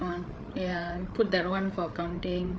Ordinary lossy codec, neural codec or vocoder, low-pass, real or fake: none; codec, 16 kHz, 8 kbps, FreqCodec, larger model; none; fake